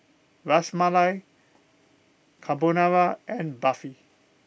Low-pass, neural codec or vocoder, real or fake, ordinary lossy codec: none; none; real; none